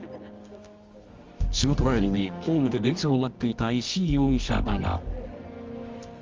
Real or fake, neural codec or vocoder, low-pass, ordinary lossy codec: fake; codec, 24 kHz, 0.9 kbps, WavTokenizer, medium music audio release; 7.2 kHz; Opus, 32 kbps